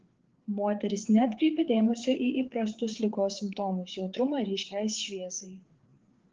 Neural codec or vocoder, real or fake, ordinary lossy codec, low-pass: codec, 16 kHz, 4 kbps, FreqCodec, larger model; fake; Opus, 16 kbps; 7.2 kHz